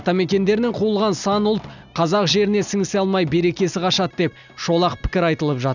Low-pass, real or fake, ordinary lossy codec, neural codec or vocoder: 7.2 kHz; real; none; none